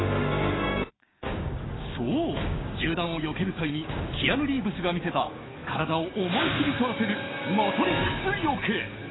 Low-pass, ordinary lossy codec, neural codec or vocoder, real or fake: 7.2 kHz; AAC, 16 kbps; codec, 16 kHz, 6 kbps, DAC; fake